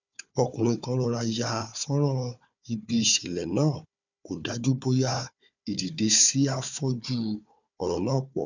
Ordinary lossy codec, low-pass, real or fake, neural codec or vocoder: none; 7.2 kHz; fake; codec, 16 kHz, 4 kbps, FunCodec, trained on Chinese and English, 50 frames a second